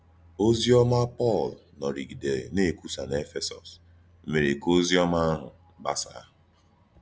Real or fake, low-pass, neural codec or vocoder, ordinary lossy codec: real; none; none; none